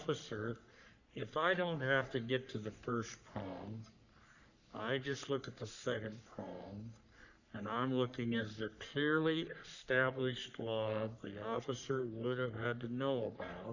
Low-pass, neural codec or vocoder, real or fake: 7.2 kHz; codec, 44.1 kHz, 3.4 kbps, Pupu-Codec; fake